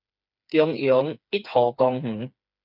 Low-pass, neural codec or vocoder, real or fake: 5.4 kHz; codec, 16 kHz, 4 kbps, FreqCodec, smaller model; fake